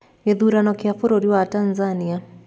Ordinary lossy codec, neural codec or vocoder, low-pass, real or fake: none; none; none; real